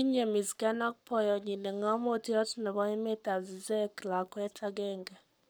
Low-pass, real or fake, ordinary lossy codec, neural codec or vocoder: none; fake; none; codec, 44.1 kHz, 7.8 kbps, Pupu-Codec